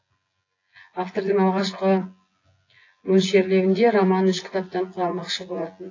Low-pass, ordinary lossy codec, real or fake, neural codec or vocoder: 7.2 kHz; AAC, 32 kbps; fake; vocoder, 24 kHz, 100 mel bands, Vocos